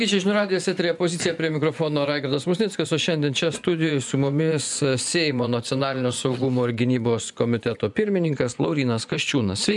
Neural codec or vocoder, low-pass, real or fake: vocoder, 24 kHz, 100 mel bands, Vocos; 10.8 kHz; fake